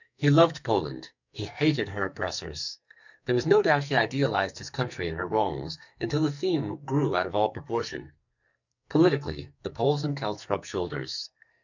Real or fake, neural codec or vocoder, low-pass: fake; codec, 44.1 kHz, 2.6 kbps, SNAC; 7.2 kHz